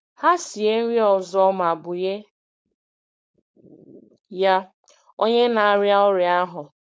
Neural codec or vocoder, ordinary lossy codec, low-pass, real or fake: codec, 16 kHz, 4.8 kbps, FACodec; none; none; fake